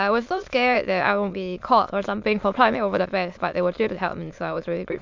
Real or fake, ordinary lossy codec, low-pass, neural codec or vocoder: fake; MP3, 64 kbps; 7.2 kHz; autoencoder, 22.05 kHz, a latent of 192 numbers a frame, VITS, trained on many speakers